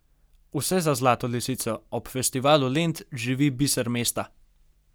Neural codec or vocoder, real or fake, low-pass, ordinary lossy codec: none; real; none; none